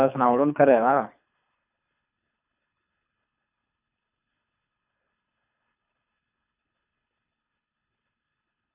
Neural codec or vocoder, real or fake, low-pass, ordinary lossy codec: codec, 24 kHz, 3 kbps, HILCodec; fake; 3.6 kHz; none